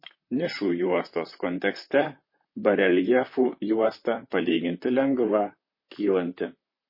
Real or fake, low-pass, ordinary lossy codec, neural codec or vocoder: fake; 5.4 kHz; MP3, 24 kbps; vocoder, 44.1 kHz, 128 mel bands, Pupu-Vocoder